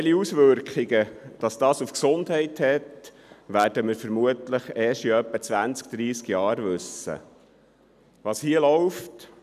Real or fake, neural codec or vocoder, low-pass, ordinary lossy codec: real; none; 14.4 kHz; none